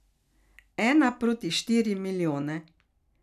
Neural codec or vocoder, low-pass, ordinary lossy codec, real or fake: none; 14.4 kHz; none; real